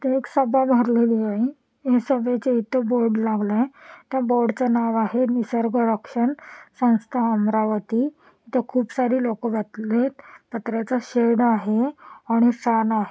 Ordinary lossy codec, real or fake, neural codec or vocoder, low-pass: none; real; none; none